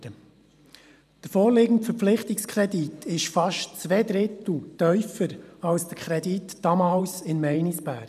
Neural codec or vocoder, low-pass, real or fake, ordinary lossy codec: vocoder, 44.1 kHz, 128 mel bands every 512 samples, BigVGAN v2; 14.4 kHz; fake; none